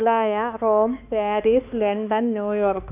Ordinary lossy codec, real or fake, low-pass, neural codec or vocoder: none; fake; 3.6 kHz; codec, 16 kHz, 2 kbps, X-Codec, HuBERT features, trained on balanced general audio